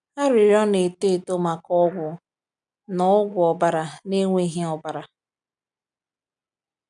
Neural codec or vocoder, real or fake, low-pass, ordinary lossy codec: none; real; 9.9 kHz; none